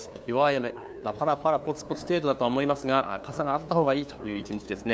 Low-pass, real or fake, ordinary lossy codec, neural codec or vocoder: none; fake; none; codec, 16 kHz, 2 kbps, FunCodec, trained on LibriTTS, 25 frames a second